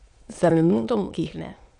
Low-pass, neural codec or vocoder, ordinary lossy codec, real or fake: 9.9 kHz; autoencoder, 22.05 kHz, a latent of 192 numbers a frame, VITS, trained on many speakers; none; fake